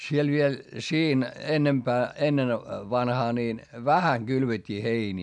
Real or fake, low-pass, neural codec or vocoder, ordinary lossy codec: real; 10.8 kHz; none; none